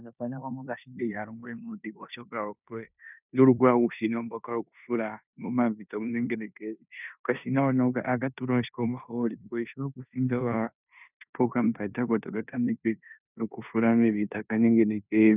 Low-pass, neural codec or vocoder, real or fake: 3.6 kHz; codec, 16 kHz in and 24 kHz out, 0.9 kbps, LongCat-Audio-Codec, four codebook decoder; fake